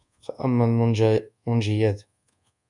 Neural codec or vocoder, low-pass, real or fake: codec, 24 kHz, 1.2 kbps, DualCodec; 10.8 kHz; fake